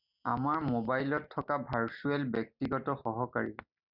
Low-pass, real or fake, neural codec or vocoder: 5.4 kHz; real; none